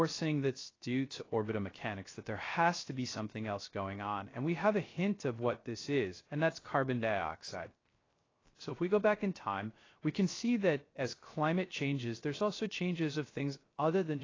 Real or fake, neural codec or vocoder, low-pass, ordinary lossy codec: fake; codec, 16 kHz, 0.3 kbps, FocalCodec; 7.2 kHz; AAC, 32 kbps